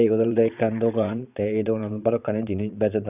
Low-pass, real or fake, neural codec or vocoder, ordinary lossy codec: 3.6 kHz; fake; vocoder, 22.05 kHz, 80 mel bands, Vocos; none